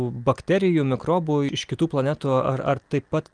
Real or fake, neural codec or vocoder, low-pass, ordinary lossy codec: real; none; 9.9 kHz; Opus, 32 kbps